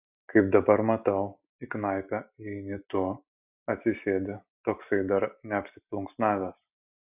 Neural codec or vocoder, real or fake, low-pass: none; real; 3.6 kHz